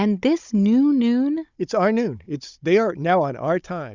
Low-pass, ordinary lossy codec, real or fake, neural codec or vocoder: 7.2 kHz; Opus, 64 kbps; fake; codec, 16 kHz, 16 kbps, FunCodec, trained on Chinese and English, 50 frames a second